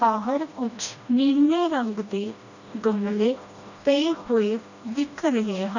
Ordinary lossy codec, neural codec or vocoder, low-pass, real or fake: none; codec, 16 kHz, 1 kbps, FreqCodec, smaller model; 7.2 kHz; fake